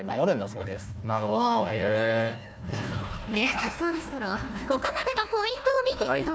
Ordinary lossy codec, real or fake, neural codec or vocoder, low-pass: none; fake; codec, 16 kHz, 1 kbps, FunCodec, trained on Chinese and English, 50 frames a second; none